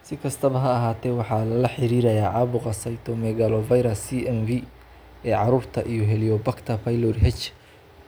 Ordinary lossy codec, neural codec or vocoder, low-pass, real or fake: none; none; none; real